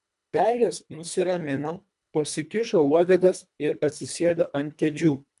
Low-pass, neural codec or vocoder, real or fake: 10.8 kHz; codec, 24 kHz, 1.5 kbps, HILCodec; fake